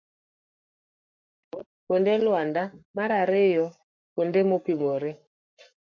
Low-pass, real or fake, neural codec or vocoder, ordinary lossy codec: 7.2 kHz; fake; codec, 44.1 kHz, 7.8 kbps, Pupu-Codec; MP3, 64 kbps